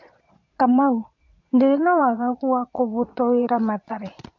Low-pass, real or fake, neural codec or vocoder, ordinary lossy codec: 7.2 kHz; fake; vocoder, 22.05 kHz, 80 mel bands, Vocos; MP3, 48 kbps